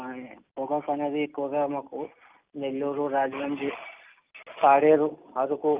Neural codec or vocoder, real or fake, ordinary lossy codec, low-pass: none; real; Opus, 32 kbps; 3.6 kHz